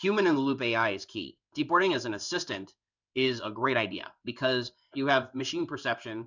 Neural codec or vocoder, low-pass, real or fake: codec, 16 kHz in and 24 kHz out, 1 kbps, XY-Tokenizer; 7.2 kHz; fake